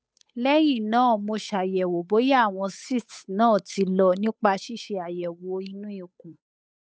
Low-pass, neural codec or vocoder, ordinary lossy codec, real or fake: none; codec, 16 kHz, 8 kbps, FunCodec, trained on Chinese and English, 25 frames a second; none; fake